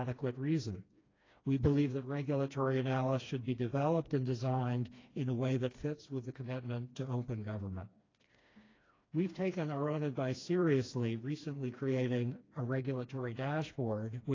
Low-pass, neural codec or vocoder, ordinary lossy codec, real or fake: 7.2 kHz; codec, 16 kHz, 2 kbps, FreqCodec, smaller model; AAC, 32 kbps; fake